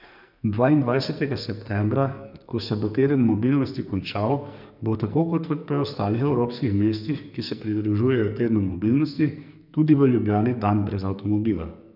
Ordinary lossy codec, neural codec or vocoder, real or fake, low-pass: none; codec, 44.1 kHz, 2.6 kbps, SNAC; fake; 5.4 kHz